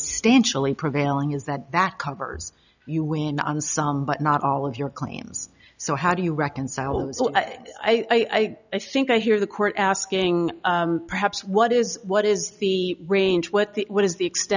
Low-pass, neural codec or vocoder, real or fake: 7.2 kHz; none; real